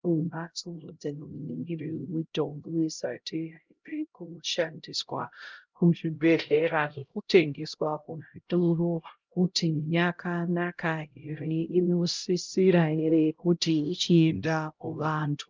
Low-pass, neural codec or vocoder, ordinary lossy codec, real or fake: 7.2 kHz; codec, 16 kHz, 0.5 kbps, X-Codec, HuBERT features, trained on LibriSpeech; Opus, 24 kbps; fake